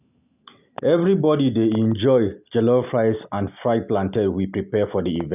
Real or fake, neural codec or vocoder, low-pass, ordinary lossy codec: real; none; 3.6 kHz; none